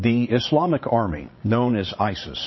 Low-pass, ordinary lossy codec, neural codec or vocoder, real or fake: 7.2 kHz; MP3, 24 kbps; vocoder, 22.05 kHz, 80 mel bands, Vocos; fake